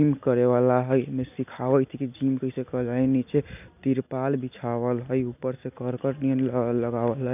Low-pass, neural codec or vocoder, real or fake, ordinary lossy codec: 3.6 kHz; none; real; none